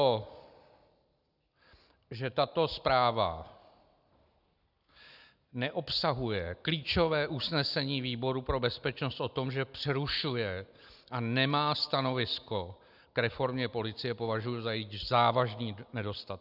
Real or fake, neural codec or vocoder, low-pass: real; none; 5.4 kHz